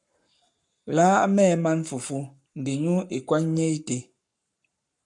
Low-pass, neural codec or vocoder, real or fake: 10.8 kHz; codec, 44.1 kHz, 7.8 kbps, Pupu-Codec; fake